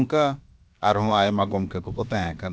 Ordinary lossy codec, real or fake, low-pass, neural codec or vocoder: none; fake; none; codec, 16 kHz, about 1 kbps, DyCAST, with the encoder's durations